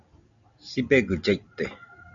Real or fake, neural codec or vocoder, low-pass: real; none; 7.2 kHz